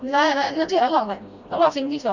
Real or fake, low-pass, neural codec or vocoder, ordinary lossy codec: fake; 7.2 kHz; codec, 16 kHz, 1 kbps, FreqCodec, smaller model; none